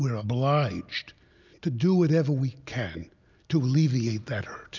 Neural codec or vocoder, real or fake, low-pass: none; real; 7.2 kHz